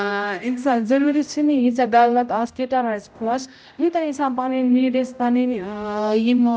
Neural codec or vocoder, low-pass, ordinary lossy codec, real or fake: codec, 16 kHz, 0.5 kbps, X-Codec, HuBERT features, trained on general audio; none; none; fake